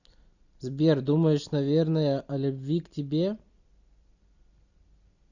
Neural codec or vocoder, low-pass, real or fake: none; 7.2 kHz; real